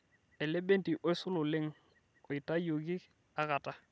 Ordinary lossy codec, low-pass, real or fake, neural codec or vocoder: none; none; real; none